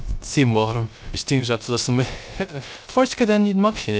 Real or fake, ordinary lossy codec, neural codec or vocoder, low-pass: fake; none; codec, 16 kHz, 0.3 kbps, FocalCodec; none